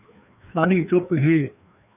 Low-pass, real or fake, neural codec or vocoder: 3.6 kHz; fake; codec, 16 kHz, 2 kbps, FreqCodec, larger model